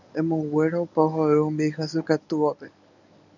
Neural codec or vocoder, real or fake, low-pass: codec, 24 kHz, 0.9 kbps, WavTokenizer, medium speech release version 1; fake; 7.2 kHz